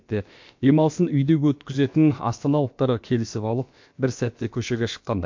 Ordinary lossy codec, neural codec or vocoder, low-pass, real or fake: MP3, 48 kbps; codec, 16 kHz, about 1 kbps, DyCAST, with the encoder's durations; 7.2 kHz; fake